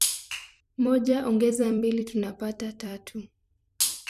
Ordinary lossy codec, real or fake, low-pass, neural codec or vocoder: none; fake; 14.4 kHz; vocoder, 44.1 kHz, 128 mel bands every 256 samples, BigVGAN v2